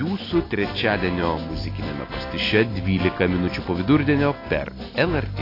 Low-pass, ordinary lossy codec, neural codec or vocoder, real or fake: 5.4 kHz; AAC, 24 kbps; none; real